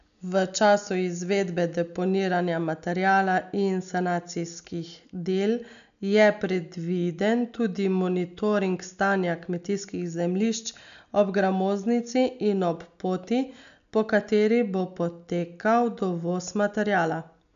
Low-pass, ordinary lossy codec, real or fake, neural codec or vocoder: 7.2 kHz; none; real; none